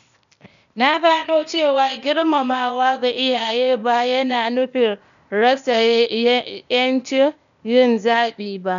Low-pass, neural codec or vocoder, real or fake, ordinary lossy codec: 7.2 kHz; codec, 16 kHz, 0.8 kbps, ZipCodec; fake; none